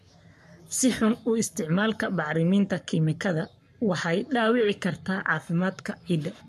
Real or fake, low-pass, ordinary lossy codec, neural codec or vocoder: fake; 14.4 kHz; MP3, 64 kbps; codec, 44.1 kHz, 7.8 kbps, Pupu-Codec